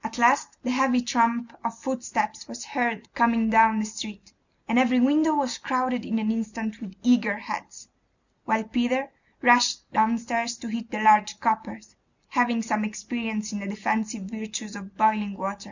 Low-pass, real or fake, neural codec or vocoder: 7.2 kHz; real; none